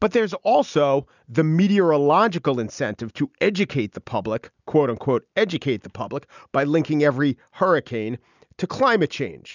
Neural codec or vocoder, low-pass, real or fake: none; 7.2 kHz; real